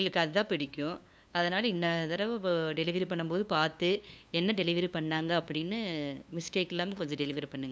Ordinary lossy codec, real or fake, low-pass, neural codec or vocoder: none; fake; none; codec, 16 kHz, 2 kbps, FunCodec, trained on LibriTTS, 25 frames a second